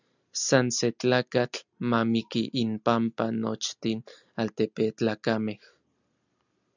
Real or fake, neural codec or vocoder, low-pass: real; none; 7.2 kHz